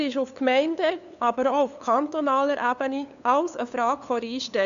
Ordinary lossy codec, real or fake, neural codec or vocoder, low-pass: none; fake; codec, 16 kHz, 2 kbps, FunCodec, trained on LibriTTS, 25 frames a second; 7.2 kHz